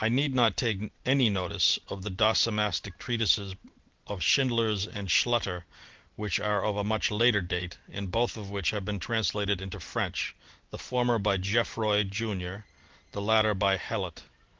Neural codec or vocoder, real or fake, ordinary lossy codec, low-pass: none; real; Opus, 16 kbps; 7.2 kHz